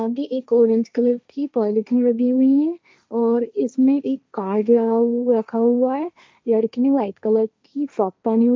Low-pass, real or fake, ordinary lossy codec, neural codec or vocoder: none; fake; none; codec, 16 kHz, 1.1 kbps, Voila-Tokenizer